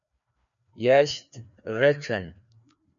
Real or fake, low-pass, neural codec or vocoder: fake; 7.2 kHz; codec, 16 kHz, 2 kbps, FreqCodec, larger model